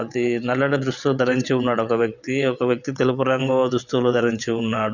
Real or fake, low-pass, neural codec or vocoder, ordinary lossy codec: fake; 7.2 kHz; vocoder, 22.05 kHz, 80 mel bands, WaveNeXt; none